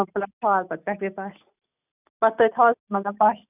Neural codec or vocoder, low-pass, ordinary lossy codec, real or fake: none; 3.6 kHz; none; real